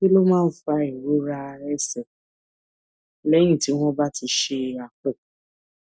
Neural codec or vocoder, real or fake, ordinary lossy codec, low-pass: none; real; none; none